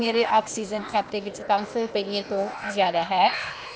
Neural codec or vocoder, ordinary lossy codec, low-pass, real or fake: codec, 16 kHz, 0.8 kbps, ZipCodec; none; none; fake